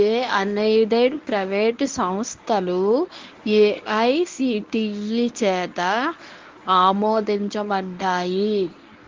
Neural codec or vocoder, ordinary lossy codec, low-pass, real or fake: codec, 24 kHz, 0.9 kbps, WavTokenizer, medium speech release version 1; Opus, 32 kbps; 7.2 kHz; fake